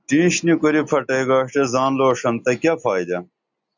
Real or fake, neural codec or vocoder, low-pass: real; none; 7.2 kHz